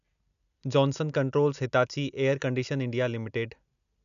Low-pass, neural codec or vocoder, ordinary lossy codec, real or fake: 7.2 kHz; none; none; real